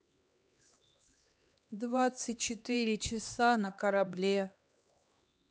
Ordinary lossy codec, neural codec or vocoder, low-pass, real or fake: none; codec, 16 kHz, 2 kbps, X-Codec, HuBERT features, trained on LibriSpeech; none; fake